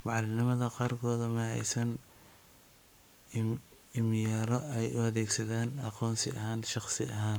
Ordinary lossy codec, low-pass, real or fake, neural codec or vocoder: none; none; fake; codec, 44.1 kHz, 7.8 kbps, Pupu-Codec